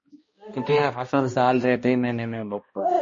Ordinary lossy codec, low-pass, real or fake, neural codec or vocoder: MP3, 32 kbps; 7.2 kHz; fake; codec, 16 kHz, 1 kbps, X-Codec, HuBERT features, trained on balanced general audio